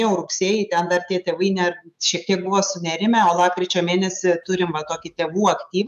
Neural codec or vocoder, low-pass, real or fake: autoencoder, 48 kHz, 128 numbers a frame, DAC-VAE, trained on Japanese speech; 14.4 kHz; fake